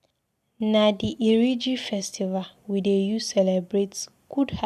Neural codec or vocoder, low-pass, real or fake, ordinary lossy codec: none; 14.4 kHz; real; none